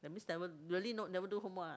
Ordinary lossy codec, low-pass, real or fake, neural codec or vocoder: none; none; real; none